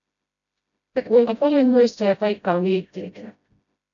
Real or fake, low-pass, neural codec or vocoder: fake; 7.2 kHz; codec, 16 kHz, 0.5 kbps, FreqCodec, smaller model